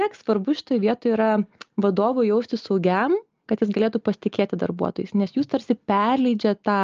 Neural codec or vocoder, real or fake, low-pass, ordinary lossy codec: none; real; 7.2 kHz; Opus, 24 kbps